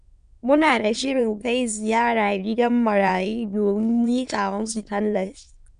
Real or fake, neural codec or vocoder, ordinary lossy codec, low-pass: fake; autoencoder, 22.05 kHz, a latent of 192 numbers a frame, VITS, trained on many speakers; MP3, 96 kbps; 9.9 kHz